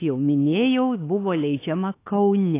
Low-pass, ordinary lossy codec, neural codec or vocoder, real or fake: 3.6 kHz; AAC, 24 kbps; autoencoder, 48 kHz, 32 numbers a frame, DAC-VAE, trained on Japanese speech; fake